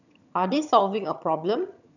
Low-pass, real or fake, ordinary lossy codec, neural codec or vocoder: 7.2 kHz; fake; none; vocoder, 22.05 kHz, 80 mel bands, HiFi-GAN